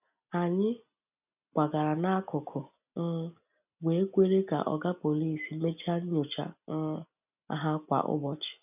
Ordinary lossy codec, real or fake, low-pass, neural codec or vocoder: none; real; 3.6 kHz; none